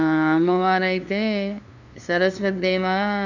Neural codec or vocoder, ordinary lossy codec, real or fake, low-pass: codec, 16 kHz, 2 kbps, FunCodec, trained on LibriTTS, 25 frames a second; none; fake; 7.2 kHz